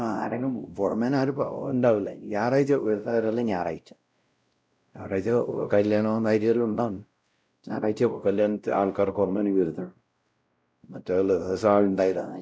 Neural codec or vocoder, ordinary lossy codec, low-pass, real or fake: codec, 16 kHz, 0.5 kbps, X-Codec, WavLM features, trained on Multilingual LibriSpeech; none; none; fake